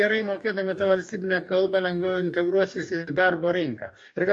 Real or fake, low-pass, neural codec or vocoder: fake; 10.8 kHz; codec, 44.1 kHz, 2.6 kbps, DAC